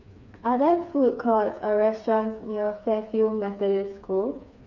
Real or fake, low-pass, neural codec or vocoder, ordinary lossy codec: fake; 7.2 kHz; codec, 16 kHz, 4 kbps, FreqCodec, smaller model; none